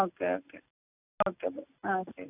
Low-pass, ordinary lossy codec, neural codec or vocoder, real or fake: 3.6 kHz; none; none; real